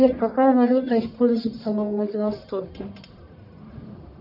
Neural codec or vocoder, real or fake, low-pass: codec, 44.1 kHz, 1.7 kbps, Pupu-Codec; fake; 5.4 kHz